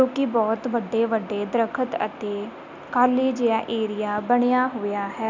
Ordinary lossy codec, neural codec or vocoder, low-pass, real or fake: none; none; 7.2 kHz; real